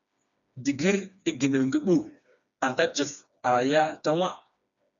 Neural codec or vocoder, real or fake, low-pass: codec, 16 kHz, 2 kbps, FreqCodec, smaller model; fake; 7.2 kHz